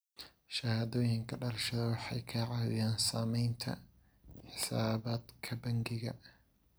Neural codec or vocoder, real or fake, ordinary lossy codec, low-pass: none; real; none; none